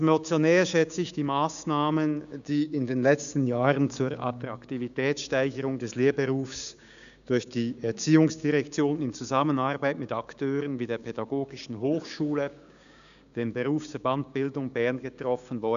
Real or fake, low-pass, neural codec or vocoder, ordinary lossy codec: fake; 7.2 kHz; codec, 16 kHz, 6 kbps, DAC; none